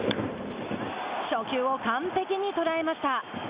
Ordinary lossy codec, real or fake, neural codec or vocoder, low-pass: Opus, 64 kbps; real; none; 3.6 kHz